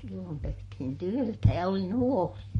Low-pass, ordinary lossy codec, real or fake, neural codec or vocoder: 19.8 kHz; MP3, 48 kbps; fake; codec, 44.1 kHz, 7.8 kbps, Pupu-Codec